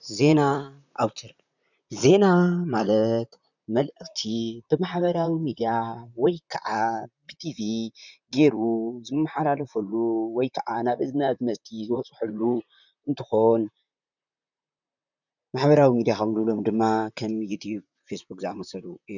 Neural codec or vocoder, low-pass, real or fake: vocoder, 44.1 kHz, 128 mel bands, Pupu-Vocoder; 7.2 kHz; fake